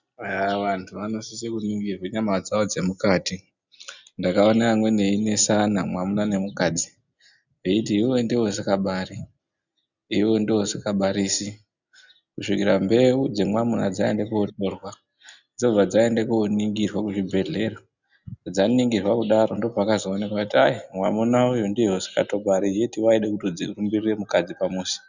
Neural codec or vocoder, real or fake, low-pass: none; real; 7.2 kHz